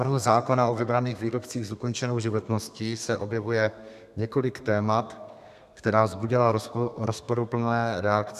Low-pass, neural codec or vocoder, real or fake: 14.4 kHz; codec, 32 kHz, 1.9 kbps, SNAC; fake